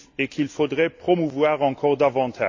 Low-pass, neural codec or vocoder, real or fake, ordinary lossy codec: 7.2 kHz; none; real; AAC, 48 kbps